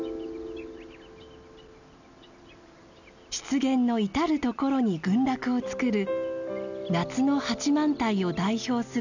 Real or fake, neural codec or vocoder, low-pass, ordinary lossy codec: real; none; 7.2 kHz; none